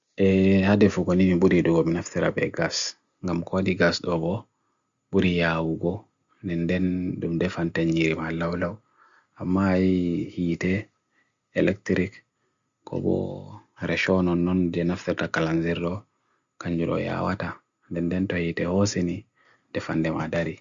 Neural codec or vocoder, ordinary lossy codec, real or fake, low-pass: none; Opus, 64 kbps; real; 7.2 kHz